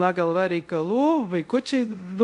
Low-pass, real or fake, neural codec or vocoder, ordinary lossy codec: 10.8 kHz; fake; codec, 24 kHz, 0.5 kbps, DualCodec; MP3, 64 kbps